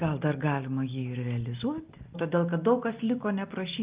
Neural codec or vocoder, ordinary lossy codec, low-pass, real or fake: none; Opus, 24 kbps; 3.6 kHz; real